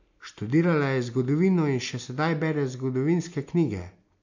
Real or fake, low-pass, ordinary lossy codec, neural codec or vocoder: real; 7.2 kHz; MP3, 48 kbps; none